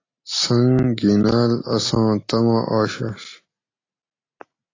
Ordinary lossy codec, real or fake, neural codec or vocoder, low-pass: AAC, 32 kbps; real; none; 7.2 kHz